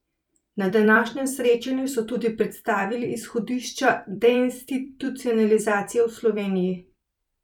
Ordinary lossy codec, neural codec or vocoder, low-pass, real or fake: none; vocoder, 44.1 kHz, 128 mel bands every 256 samples, BigVGAN v2; 19.8 kHz; fake